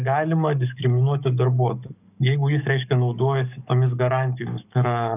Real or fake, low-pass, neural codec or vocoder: fake; 3.6 kHz; codec, 44.1 kHz, 7.8 kbps, DAC